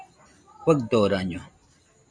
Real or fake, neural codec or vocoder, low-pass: real; none; 9.9 kHz